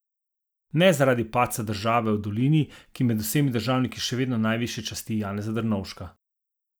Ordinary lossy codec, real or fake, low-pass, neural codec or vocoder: none; real; none; none